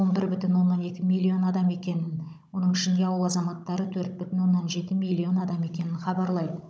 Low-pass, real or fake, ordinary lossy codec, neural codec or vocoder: none; fake; none; codec, 16 kHz, 16 kbps, FunCodec, trained on Chinese and English, 50 frames a second